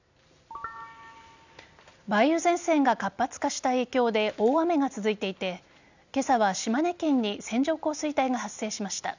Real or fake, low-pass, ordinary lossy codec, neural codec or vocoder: real; 7.2 kHz; none; none